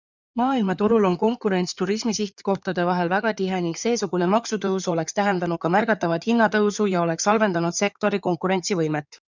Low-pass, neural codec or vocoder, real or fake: 7.2 kHz; codec, 16 kHz in and 24 kHz out, 2.2 kbps, FireRedTTS-2 codec; fake